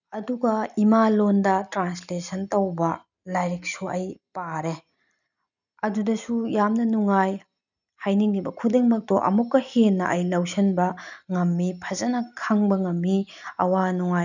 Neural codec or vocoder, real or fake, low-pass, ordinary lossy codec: none; real; 7.2 kHz; none